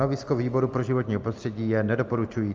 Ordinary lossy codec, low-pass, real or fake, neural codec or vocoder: Opus, 24 kbps; 7.2 kHz; real; none